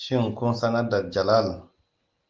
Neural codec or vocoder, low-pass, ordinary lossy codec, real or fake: none; 7.2 kHz; Opus, 32 kbps; real